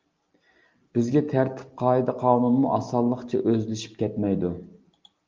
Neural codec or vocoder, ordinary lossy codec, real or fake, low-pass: none; Opus, 32 kbps; real; 7.2 kHz